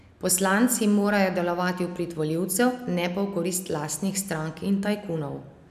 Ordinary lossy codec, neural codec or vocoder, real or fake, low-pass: none; none; real; 14.4 kHz